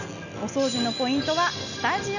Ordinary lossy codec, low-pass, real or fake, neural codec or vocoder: none; 7.2 kHz; real; none